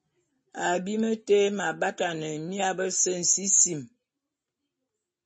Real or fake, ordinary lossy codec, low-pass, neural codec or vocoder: real; MP3, 32 kbps; 9.9 kHz; none